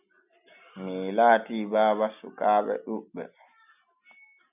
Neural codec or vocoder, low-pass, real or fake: none; 3.6 kHz; real